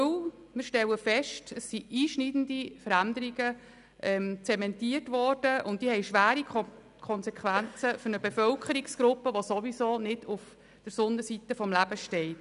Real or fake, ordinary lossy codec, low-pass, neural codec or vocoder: real; none; 10.8 kHz; none